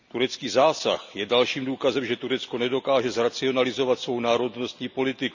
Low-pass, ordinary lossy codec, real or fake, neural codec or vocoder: 7.2 kHz; none; real; none